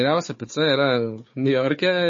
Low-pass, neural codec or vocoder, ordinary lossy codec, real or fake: 7.2 kHz; codec, 16 kHz, 4 kbps, FunCodec, trained on Chinese and English, 50 frames a second; MP3, 32 kbps; fake